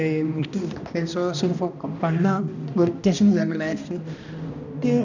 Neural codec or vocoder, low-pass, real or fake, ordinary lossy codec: codec, 16 kHz, 1 kbps, X-Codec, HuBERT features, trained on balanced general audio; 7.2 kHz; fake; none